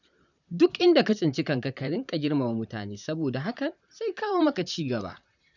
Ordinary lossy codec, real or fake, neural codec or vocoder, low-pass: none; fake; vocoder, 44.1 kHz, 128 mel bands, Pupu-Vocoder; 7.2 kHz